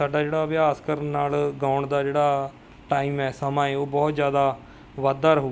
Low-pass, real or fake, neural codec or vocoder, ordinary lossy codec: none; real; none; none